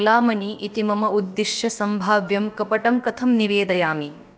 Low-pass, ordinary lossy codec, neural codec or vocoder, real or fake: none; none; codec, 16 kHz, about 1 kbps, DyCAST, with the encoder's durations; fake